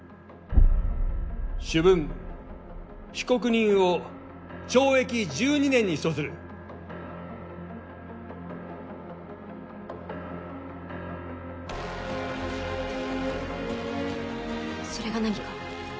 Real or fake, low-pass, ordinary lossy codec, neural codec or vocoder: real; none; none; none